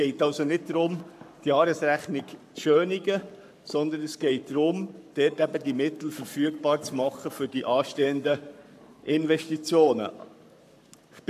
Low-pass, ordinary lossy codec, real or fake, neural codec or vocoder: 14.4 kHz; MP3, 96 kbps; fake; codec, 44.1 kHz, 7.8 kbps, Pupu-Codec